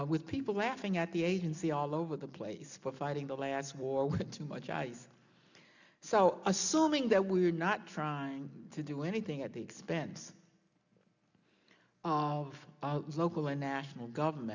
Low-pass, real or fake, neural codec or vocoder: 7.2 kHz; real; none